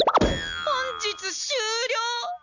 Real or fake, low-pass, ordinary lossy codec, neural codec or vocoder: real; 7.2 kHz; none; none